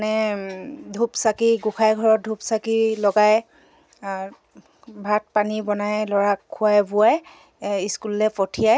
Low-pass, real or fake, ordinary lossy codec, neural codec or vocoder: none; real; none; none